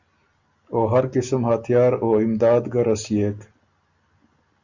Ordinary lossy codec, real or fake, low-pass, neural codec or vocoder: Opus, 64 kbps; real; 7.2 kHz; none